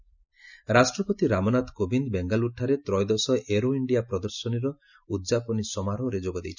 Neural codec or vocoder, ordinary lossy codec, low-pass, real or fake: none; none; 7.2 kHz; real